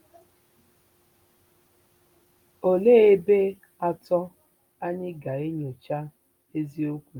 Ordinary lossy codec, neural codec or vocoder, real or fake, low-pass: Opus, 32 kbps; none; real; 19.8 kHz